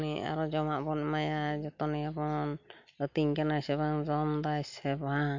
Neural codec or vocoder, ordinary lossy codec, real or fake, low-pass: none; MP3, 64 kbps; real; 7.2 kHz